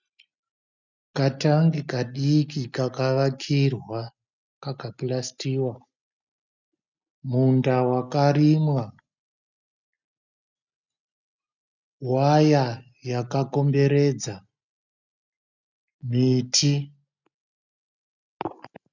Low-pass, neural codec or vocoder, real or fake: 7.2 kHz; none; real